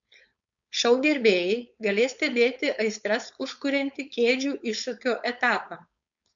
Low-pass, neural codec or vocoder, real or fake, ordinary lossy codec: 7.2 kHz; codec, 16 kHz, 4.8 kbps, FACodec; fake; MP3, 64 kbps